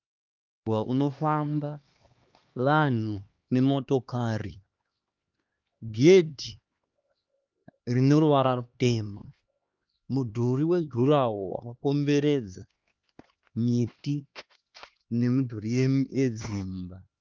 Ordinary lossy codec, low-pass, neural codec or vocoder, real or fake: Opus, 32 kbps; 7.2 kHz; codec, 16 kHz, 2 kbps, X-Codec, HuBERT features, trained on LibriSpeech; fake